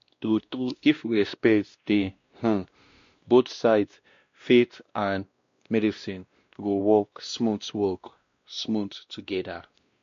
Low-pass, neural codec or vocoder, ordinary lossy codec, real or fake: 7.2 kHz; codec, 16 kHz, 1 kbps, X-Codec, WavLM features, trained on Multilingual LibriSpeech; MP3, 48 kbps; fake